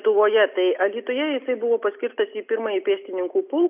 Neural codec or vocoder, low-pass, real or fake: none; 3.6 kHz; real